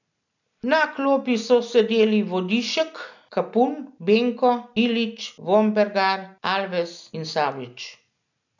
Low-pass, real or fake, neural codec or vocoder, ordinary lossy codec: 7.2 kHz; real; none; none